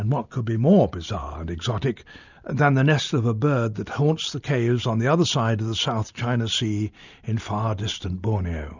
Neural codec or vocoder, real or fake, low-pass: none; real; 7.2 kHz